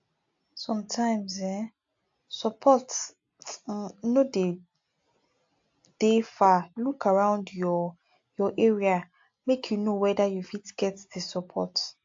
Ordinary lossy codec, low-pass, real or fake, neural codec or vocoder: AAC, 48 kbps; 7.2 kHz; real; none